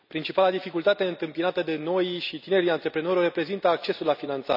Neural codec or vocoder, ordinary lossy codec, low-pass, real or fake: none; none; 5.4 kHz; real